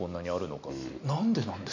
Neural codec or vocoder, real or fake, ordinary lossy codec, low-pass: none; real; none; 7.2 kHz